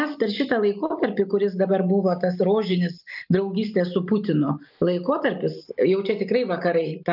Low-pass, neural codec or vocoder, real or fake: 5.4 kHz; none; real